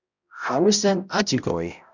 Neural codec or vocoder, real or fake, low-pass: codec, 16 kHz, 0.5 kbps, X-Codec, HuBERT features, trained on balanced general audio; fake; 7.2 kHz